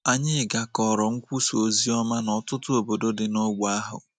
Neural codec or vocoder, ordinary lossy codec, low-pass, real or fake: none; none; 9.9 kHz; real